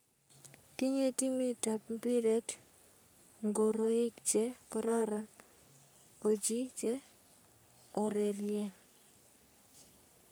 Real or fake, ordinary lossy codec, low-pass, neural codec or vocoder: fake; none; none; codec, 44.1 kHz, 3.4 kbps, Pupu-Codec